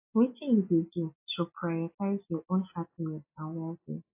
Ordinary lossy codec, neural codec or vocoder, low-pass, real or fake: AAC, 32 kbps; none; 3.6 kHz; real